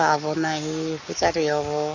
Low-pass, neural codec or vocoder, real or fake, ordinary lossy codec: 7.2 kHz; codec, 44.1 kHz, 7.8 kbps, Pupu-Codec; fake; none